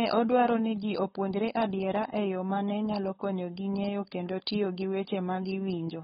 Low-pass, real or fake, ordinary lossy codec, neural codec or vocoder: 7.2 kHz; fake; AAC, 16 kbps; codec, 16 kHz, 4.8 kbps, FACodec